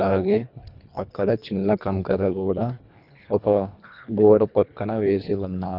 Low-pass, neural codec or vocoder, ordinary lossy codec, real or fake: 5.4 kHz; codec, 24 kHz, 1.5 kbps, HILCodec; none; fake